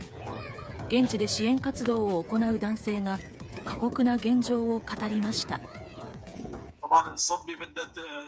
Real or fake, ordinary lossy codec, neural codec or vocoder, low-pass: fake; none; codec, 16 kHz, 8 kbps, FreqCodec, smaller model; none